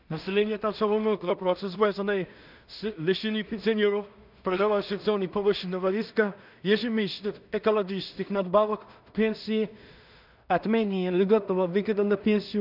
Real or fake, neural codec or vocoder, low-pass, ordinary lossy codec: fake; codec, 16 kHz in and 24 kHz out, 0.4 kbps, LongCat-Audio-Codec, two codebook decoder; 5.4 kHz; none